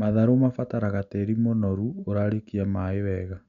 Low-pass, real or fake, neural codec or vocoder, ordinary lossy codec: 7.2 kHz; real; none; none